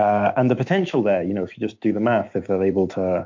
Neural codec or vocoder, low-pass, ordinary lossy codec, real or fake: autoencoder, 48 kHz, 128 numbers a frame, DAC-VAE, trained on Japanese speech; 7.2 kHz; MP3, 48 kbps; fake